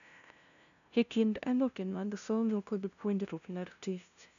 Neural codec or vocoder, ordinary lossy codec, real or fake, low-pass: codec, 16 kHz, 0.5 kbps, FunCodec, trained on LibriTTS, 25 frames a second; none; fake; 7.2 kHz